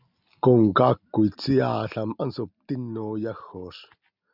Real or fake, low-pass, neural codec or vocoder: real; 5.4 kHz; none